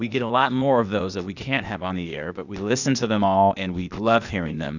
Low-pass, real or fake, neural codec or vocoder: 7.2 kHz; fake; codec, 16 kHz, 0.8 kbps, ZipCodec